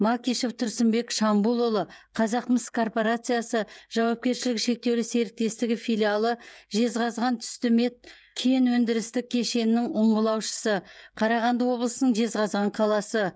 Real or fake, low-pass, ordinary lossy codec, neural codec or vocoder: fake; none; none; codec, 16 kHz, 16 kbps, FreqCodec, smaller model